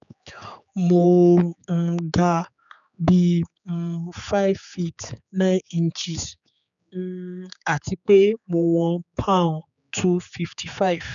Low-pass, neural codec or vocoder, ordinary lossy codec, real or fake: 7.2 kHz; codec, 16 kHz, 4 kbps, X-Codec, HuBERT features, trained on general audio; none; fake